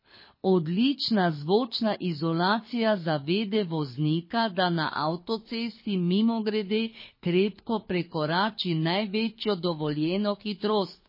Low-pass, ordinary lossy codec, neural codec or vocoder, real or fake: 5.4 kHz; MP3, 24 kbps; codec, 24 kHz, 6 kbps, HILCodec; fake